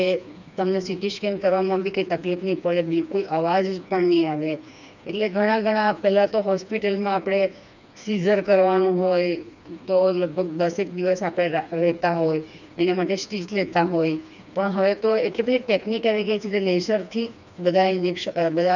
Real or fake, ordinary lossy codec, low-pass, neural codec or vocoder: fake; none; 7.2 kHz; codec, 16 kHz, 2 kbps, FreqCodec, smaller model